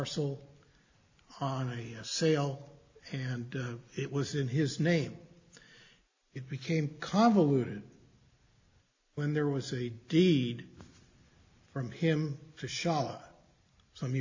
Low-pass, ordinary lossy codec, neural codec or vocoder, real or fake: 7.2 kHz; AAC, 48 kbps; none; real